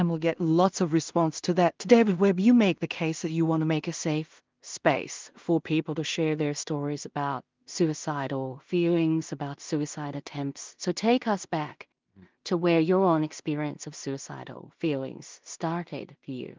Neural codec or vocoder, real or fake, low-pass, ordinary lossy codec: codec, 16 kHz in and 24 kHz out, 0.4 kbps, LongCat-Audio-Codec, two codebook decoder; fake; 7.2 kHz; Opus, 32 kbps